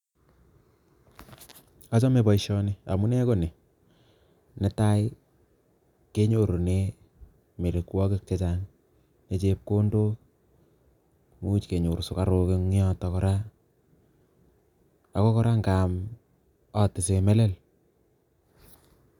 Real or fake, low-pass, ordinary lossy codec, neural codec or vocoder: real; 19.8 kHz; none; none